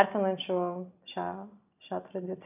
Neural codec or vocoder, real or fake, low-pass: none; real; 3.6 kHz